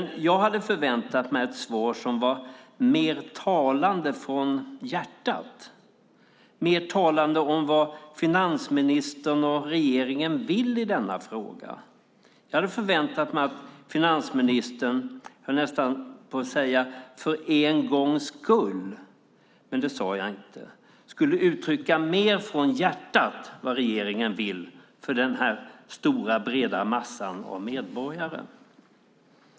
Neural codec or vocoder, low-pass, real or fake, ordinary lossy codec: none; none; real; none